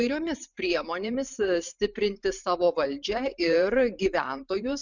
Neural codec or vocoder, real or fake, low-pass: vocoder, 44.1 kHz, 128 mel bands every 512 samples, BigVGAN v2; fake; 7.2 kHz